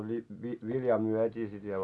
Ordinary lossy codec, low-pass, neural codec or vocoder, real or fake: none; none; none; real